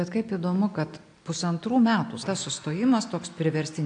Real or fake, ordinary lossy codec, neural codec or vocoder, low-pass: real; AAC, 64 kbps; none; 9.9 kHz